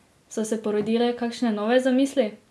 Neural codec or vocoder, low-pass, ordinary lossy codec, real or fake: none; none; none; real